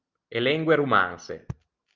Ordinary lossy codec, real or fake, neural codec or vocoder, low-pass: Opus, 32 kbps; real; none; 7.2 kHz